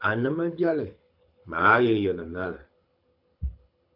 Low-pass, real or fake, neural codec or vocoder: 5.4 kHz; fake; codec, 24 kHz, 6 kbps, HILCodec